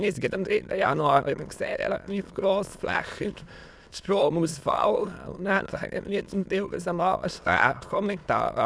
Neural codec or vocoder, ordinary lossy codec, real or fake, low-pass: autoencoder, 22.05 kHz, a latent of 192 numbers a frame, VITS, trained on many speakers; none; fake; none